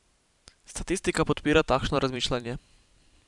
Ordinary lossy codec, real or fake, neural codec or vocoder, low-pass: none; fake; vocoder, 44.1 kHz, 128 mel bands every 512 samples, BigVGAN v2; 10.8 kHz